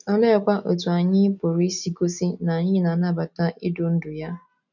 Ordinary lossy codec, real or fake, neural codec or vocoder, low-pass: none; real; none; 7.2 kHz